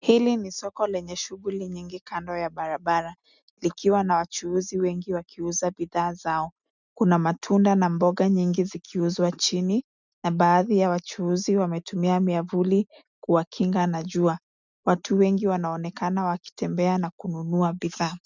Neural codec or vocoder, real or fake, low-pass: none; real; 7.2 kHz